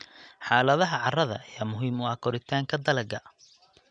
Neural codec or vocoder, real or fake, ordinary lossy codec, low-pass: none; real; none; 9.9 kHz